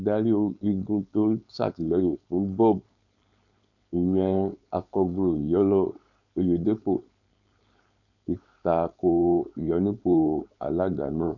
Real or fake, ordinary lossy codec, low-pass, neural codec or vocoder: fake; none; 7.2 kHz; codec, 16 kHz, 4.8 kbps, FACodec